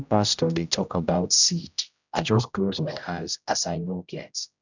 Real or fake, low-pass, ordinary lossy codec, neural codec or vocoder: fake; 7.2 kHz; none; codec, 16 kHz, 0.5 kbps, X-Codec, HuBERT features, trained on general audio